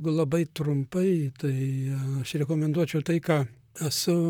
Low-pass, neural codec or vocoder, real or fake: 19.8 kHz; vocoder, 44.1 kHz, 128 mel bands, Pupu-Vocoder; fake